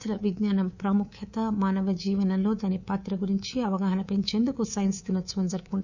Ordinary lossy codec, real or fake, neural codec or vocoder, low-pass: none; fake; codec, 24 kHz, 3.1 kbps, DualCodec; 7.2 kHz